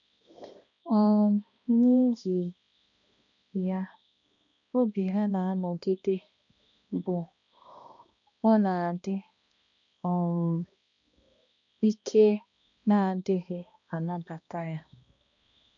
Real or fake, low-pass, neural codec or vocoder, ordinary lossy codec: fake; 7.2 kHz; codec, 16 kHz, 1 kbps, X-Codec, HuBERT features, trained on balanced general audio; none